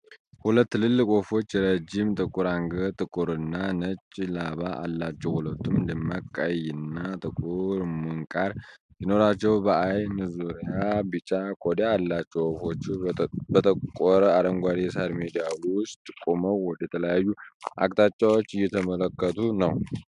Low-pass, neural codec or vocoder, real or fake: 10.8 kHz; none; real